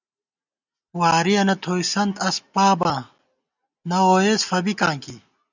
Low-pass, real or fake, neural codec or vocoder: 7.2 kHz; real; none